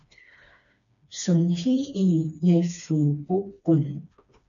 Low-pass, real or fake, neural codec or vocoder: 7.2 kHz; fake; codec, 16 kHz, 2 kbps, FreqCodec, smaller model